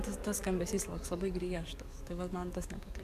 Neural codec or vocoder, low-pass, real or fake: codec, 44.1 kHz, 7.8 kbps, DAC; 14.4 kHz; fake